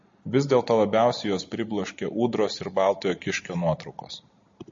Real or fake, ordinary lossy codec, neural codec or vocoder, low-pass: real; MP3, 32 kbps; none; 7.2 kHz